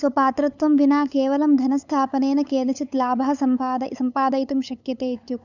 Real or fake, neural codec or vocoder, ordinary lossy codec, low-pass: fake; codec, 16 kHz, 8 kbps, FunCodec, trained on LibriTTS, 25 frames a second; none; 7.2 kHz